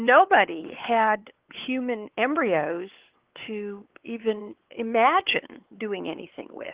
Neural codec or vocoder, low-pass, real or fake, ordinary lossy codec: codec, 16 kHz, 4 kbps, X-Codec, WavLM features, trained on Multilingual LibriSpeech; 3.6 kHz; fake; Opus, 16 kbps